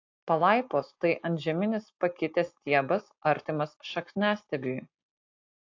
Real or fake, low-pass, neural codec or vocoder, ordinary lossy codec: real; 7.2 kHz; none; AAC, 48 kbps